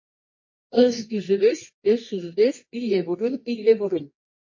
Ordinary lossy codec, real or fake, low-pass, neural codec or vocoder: MP3, 32 kbps; fake; 7.2 kHz; codec, 24 kHz, 0.9 kbps, WavTokenizer, medium music audio release